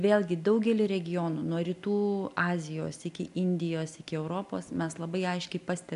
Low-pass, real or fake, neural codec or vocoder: 10.8 kHz; real; none